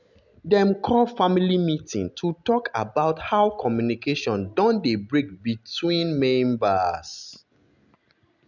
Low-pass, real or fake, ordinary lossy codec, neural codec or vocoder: 7.2 kHz; real; none; none